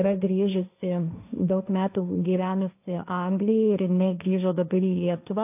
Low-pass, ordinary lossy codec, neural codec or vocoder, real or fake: 3.6 kHz; AAC, 32 kbps; codec, 16 kHz, 1.1 kbps, Voila-Tokenizer; fake